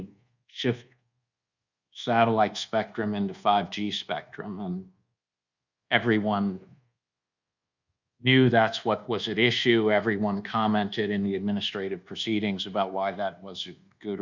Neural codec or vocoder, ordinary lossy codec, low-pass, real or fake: codec, 24 kHz, 1.2 kbps, DualCodec; Opus, 64 kbps; 7.2 kHz; fake